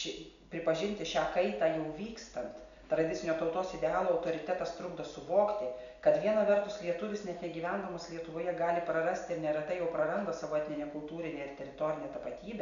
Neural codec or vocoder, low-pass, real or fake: none; 7.2 kHz; real